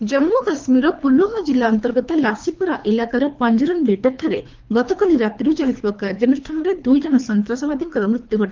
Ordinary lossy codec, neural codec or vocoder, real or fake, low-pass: Opus, 32 kbps; codec, 24 kHz, 3 kbps, HILCodec; fake; 7.2 kHz